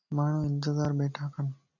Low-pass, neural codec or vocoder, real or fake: 7.2 kHz; none; real